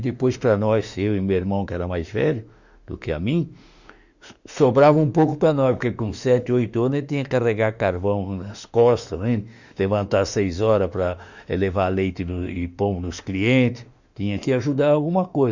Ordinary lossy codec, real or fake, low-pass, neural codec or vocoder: Opus, 64 kbps; fake; 7.2 kHz; autoencoder, 48 kHz, 32 numbers a frame, DAC-VAE, trained on Japanese speech